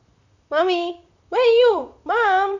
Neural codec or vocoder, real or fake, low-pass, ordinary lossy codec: vocoder, 44.1 kHz, 128 mel bands, Pupu-Vocoder; fake; 7.2 kHz; none